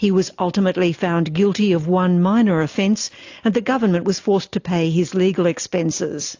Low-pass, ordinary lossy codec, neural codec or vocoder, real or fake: 7.2 kHz; AAC, 48 kbps; none; real